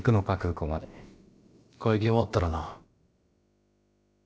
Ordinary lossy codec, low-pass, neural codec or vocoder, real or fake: none; none; codec, 16 kHz, about 1 kbps, DyCAST, with the encoder's durations; fake